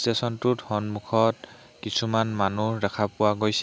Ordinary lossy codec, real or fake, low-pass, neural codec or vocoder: none; real; none; none